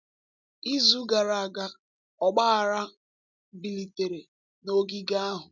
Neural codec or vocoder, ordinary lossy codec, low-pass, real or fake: none; none; 7.2 kHz; real